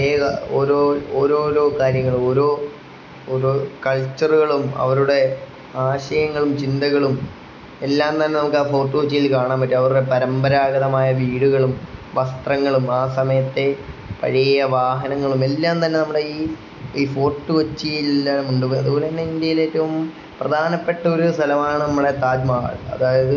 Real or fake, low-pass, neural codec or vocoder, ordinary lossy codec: real; 7.2 kHz; none; none